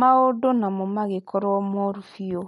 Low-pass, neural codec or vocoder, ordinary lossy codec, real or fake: 19.8 kHz; none; MP3, 48 kbps; real